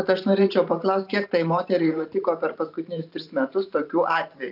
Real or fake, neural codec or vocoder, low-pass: fake; vocoder, 44.1 kHz, 128 mel bands every 512 samples, BigVGAN v2; 5.4 kHz